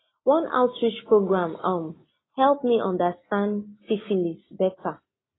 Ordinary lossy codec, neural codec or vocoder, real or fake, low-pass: AAC, 16 kbps; none; real; 7.2 kHz